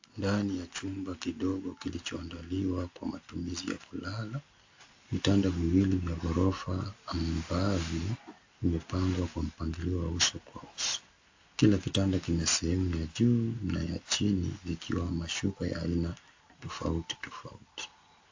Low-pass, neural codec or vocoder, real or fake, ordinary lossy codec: 7.2 kHz; none; real; AAC, 48 kbps